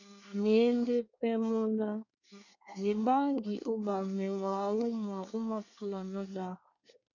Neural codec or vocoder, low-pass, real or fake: codec, 24 kHz, 1 kbps, SNAC; 7.2 kHz; fake